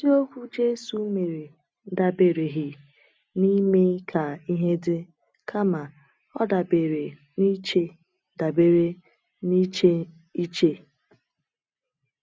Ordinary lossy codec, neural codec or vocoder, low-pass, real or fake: none; none; none; real